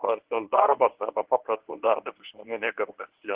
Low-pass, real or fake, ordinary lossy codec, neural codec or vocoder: 3.6 kHz; fake; Opus, 16 kbps; codec, 24 kHz, 0.9 kbps, WavTokenizer, medium speech release version 1